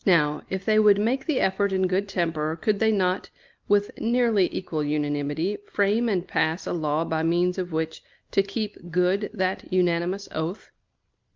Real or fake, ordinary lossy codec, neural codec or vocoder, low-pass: real; Opus, 24 kbps; none; 7.2 kHz